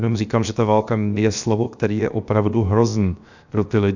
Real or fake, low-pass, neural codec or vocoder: fake; 7.2 kHz; codec, 16 kHz, 0.7 kbps, FocalCodec